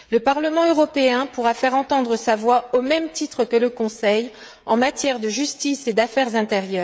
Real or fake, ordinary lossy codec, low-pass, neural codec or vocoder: fake; none; none; codec, 16 kHz, 16 kbps, FreqCodec, smaller model